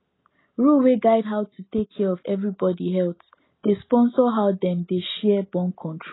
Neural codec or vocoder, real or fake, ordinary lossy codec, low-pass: none; real; AAC, 16 kbps; 7.2 kHz